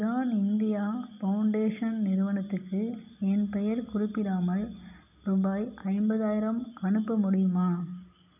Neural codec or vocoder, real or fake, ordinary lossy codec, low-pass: none; real; none; 3.6 kHz